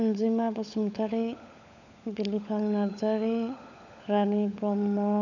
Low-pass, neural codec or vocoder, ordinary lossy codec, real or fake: 7.2 kHz; codec, 16 kHz, 16 kbps, FunCodec, trained on LibriTTS, 50 frames a second; none; fake